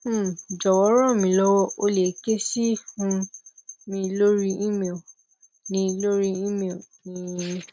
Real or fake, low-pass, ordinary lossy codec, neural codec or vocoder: real; none; none; none